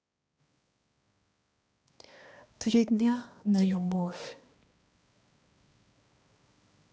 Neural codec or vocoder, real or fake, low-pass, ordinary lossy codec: codec, 16 kHz, 1 kbps, X-Codec, HuBERT features, trained on balanced general audio; fake; none; none